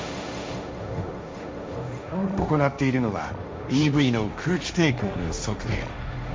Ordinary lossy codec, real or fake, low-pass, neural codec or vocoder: none; fake; none; codec, 16 kHz, 1.1 kbps, Voila-Tokenizer